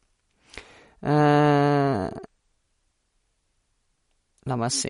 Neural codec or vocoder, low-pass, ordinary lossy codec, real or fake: none; 10.8 kHz; MP3, 48 kbps; real